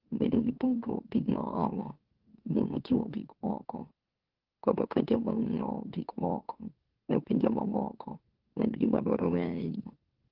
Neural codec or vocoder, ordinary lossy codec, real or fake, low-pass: autoencoder, 44.1 kHz, a latent of 192 numbers a frame, MeloTTS; Opus, 16 kbps; fake; 5.4 kHz